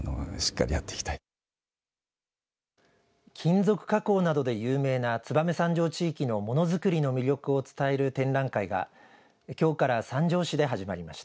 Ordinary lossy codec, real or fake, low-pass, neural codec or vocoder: none; real; none; none